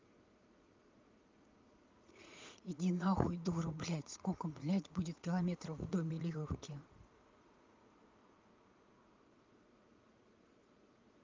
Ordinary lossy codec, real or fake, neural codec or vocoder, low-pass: Opus, 24 kbps; fake; vocoder, 44.1 kHz, 80 mel bands, Vocos; 7.2 kHz